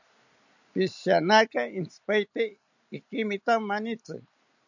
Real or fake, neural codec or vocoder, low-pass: real; none; 7.2 kHz